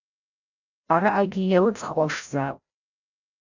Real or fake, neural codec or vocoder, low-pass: fake; codec, 16 kHz, 0.5 kbps, FreqCodec, larger model; 7.2 kHz